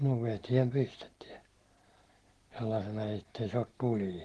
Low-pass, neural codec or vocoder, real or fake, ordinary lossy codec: 10.8 kHz; none; real; Opus, 16 kbps